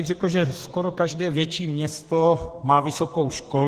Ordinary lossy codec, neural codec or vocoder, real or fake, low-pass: Opus, 16 kbps; codec, 32 kHz, 1.9 kbps, SNAC; fake; 14.4 kHz